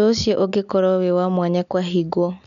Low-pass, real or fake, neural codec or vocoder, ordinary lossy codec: 7.2 kHz; real; none; none